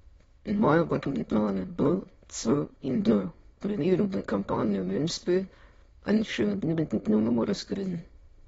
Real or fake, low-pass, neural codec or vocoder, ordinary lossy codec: fake; 9.9 kHz; autoencoder, 22.05 kHz, a latent of 192 numbers a frame, VITS, trained on many speakers; AAC, 24 kbps